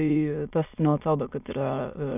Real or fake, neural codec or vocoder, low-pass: fake; autoencoder, 22.05 kHz, a latent of 192 numbers a frame, VITS, trained on many speakers; 3.6 kHz